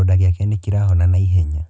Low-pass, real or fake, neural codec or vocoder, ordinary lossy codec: none; real; none; none